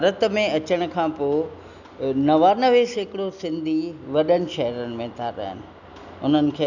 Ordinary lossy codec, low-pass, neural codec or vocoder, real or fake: none; 7.2 kHz; none; real